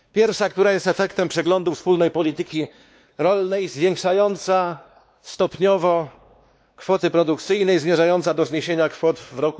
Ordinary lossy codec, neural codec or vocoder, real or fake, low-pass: none; codec, 16 kHz, 2 kbps, X-Codec, WavLM features, trained on Multilingual LibriSpeech; fake; none